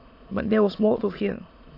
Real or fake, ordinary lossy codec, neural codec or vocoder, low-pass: fake; AAC, 32 kbps; autoencoder, 22.05 kHz, a latent of 192 numbers a frame, VITS, trained on many speakers; 5.4 kHz